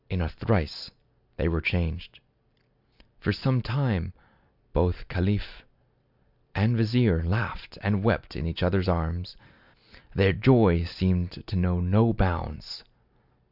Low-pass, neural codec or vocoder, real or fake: 5.4 kHz; none; real